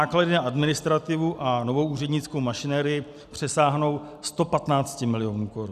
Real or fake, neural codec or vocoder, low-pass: real; none; 14.4 kHz